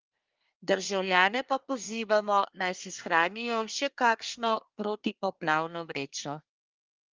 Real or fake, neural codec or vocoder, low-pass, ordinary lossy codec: fake; codec, 24 kHz, 1 kbps, SNAC; 7.2 kHz; Opus, 24 kbps